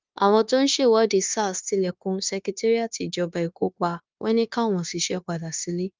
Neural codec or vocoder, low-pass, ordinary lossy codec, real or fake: codec, 16 kHz, 0.9 kbps, LongCat-Audio-Codec; 7.2 kHz; Opus, 24 kbps; fake